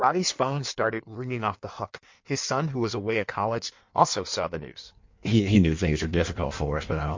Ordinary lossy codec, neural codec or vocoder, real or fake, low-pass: MP3, 48 kbps; codec, 16 kHz in and 24 kHz out, 1.1 kbps, FireRedTTS-2 codec; fake; 7.2 kHz